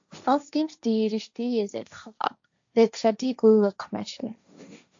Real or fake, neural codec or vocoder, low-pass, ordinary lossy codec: fake; codec, 16 kHz, 1.1 kbps, Voila-Tokenizer; 7.2 kHz; AAC, 64 kbps